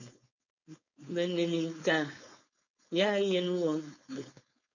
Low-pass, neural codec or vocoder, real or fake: 7.2 kHz; codec, 16 kHz, 4.8 kbps, FACodec; fake